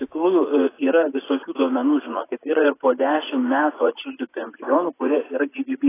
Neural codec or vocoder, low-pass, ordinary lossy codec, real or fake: vocoder, 44.1 kHz, 80 mel bands, Vocos; 3.6 kHz; AAC, 16 kbps; fake